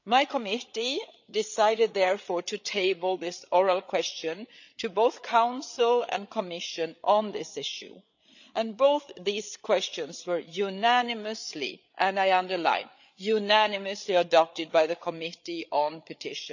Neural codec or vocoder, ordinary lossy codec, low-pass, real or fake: codec, 16 kHz, 8 kbps, FreqCodec, larger model; none; 7.2 kHz; fake